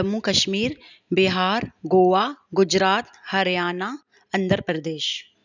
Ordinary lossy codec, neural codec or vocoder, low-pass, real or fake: none; none; 7.2 kHz; real